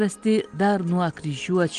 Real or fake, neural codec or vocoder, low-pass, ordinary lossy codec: real; none; 9.9 kHz; Opus, 24 kbps